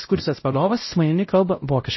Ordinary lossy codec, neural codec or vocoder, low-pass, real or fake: MP3, 24 kbps; codec, 16 kHz, 0.5 kbps, X-Codec, WavLM features, trained on Multilingual LibriSpeech; 7.2 kHz; fake